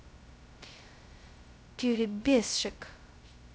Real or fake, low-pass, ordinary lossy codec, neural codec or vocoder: fake; none; none; codec, 16 kHz, 0.2 kbps, FocalCodec